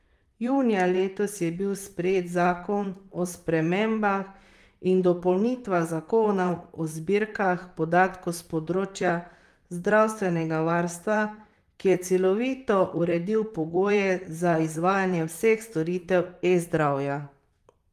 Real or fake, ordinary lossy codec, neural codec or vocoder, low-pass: fake; Opus, 32 kbps; vocoder, 44.1 kHz, 128 mel bands, Pupu-Vocoder; 14.4 kHz